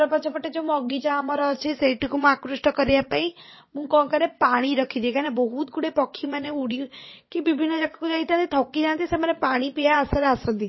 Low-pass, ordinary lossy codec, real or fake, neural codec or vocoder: 7.2 kHz; MP3, 24 kbps; fake; vocoder, 22.05 kHz, 80 mel bands, Vocos